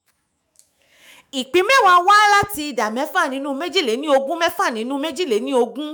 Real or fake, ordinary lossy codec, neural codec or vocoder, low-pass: fake; none; autoencoder, 48 kHz, 128 numbers a frame, DAC-VAE, trained on Japanese speech; none